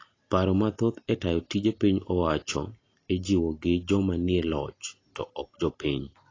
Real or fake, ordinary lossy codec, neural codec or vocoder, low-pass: real; AAC, 32 kbps; none; 7.2 kHz